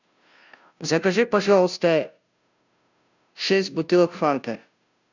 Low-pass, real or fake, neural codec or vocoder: 7.2 kHz; fake; codec, 16 kHz, 0.5 kbps, FunCodec, trained on Chinese and English, 25 frames a second